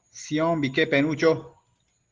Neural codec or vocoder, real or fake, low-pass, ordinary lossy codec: none; real; 7.2 kHz; Opus, 16 kbps